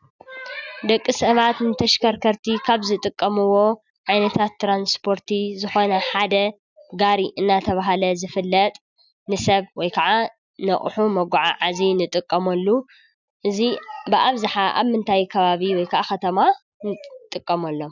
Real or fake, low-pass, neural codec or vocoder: real; 7.2 kHz; none